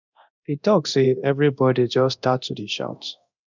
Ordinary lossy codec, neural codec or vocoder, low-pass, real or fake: none; codec, 24 kHz, 0.9 kbps, DualCodec; 7.2 kHz; fake